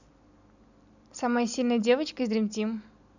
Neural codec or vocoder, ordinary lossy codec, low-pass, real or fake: none; none; 7.2 kHz; real